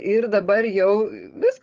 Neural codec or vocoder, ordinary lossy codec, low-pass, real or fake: codec, 16 kHz, 8 kbps, FreqCodec, larger model; Opus, 32 kbps; 7.2 kHz; fake